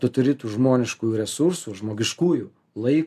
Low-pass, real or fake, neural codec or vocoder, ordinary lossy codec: 14.4 kHz; real; none; MP3, 96 kbps